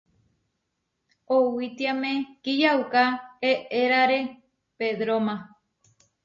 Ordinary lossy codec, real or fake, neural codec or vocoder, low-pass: MP3, 32 kbps; real; none; 7.2 kHz